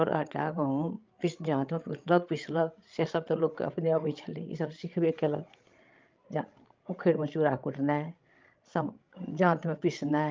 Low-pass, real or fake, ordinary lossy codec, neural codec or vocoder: 7.2 kHz; fake; Opus, 32 kbps; codec, 16 kHz, 16 kbps, FreqCodec, larger model